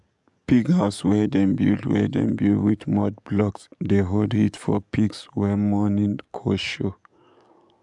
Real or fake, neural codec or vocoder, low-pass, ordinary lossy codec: fake; codec, 44.1 kHz, 7.8 kbps, DAC; 10.8 kHz; MP3, 96 kbps